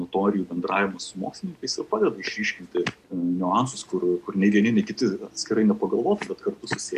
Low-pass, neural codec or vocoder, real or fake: 14.4 kHz; none; real